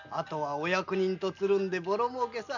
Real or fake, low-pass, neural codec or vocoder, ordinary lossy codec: real; 7.2 kHz; none; none